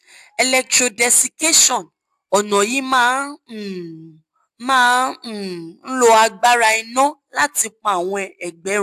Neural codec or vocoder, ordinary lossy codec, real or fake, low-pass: none; AAC, 96 kbps; real; 14.4 kHz